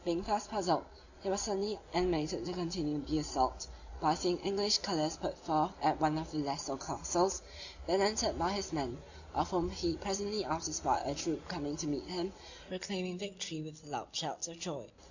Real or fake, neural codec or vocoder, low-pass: real; none; 7.2 kHz